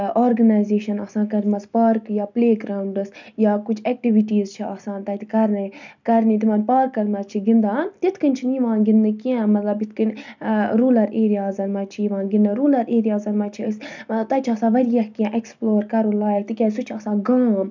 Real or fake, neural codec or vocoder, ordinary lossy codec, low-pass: real; none; none; 7.2 kHz